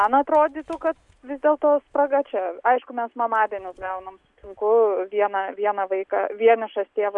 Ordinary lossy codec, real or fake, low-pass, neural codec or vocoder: MP3, 96 kbps; real; 10.8 kHz; none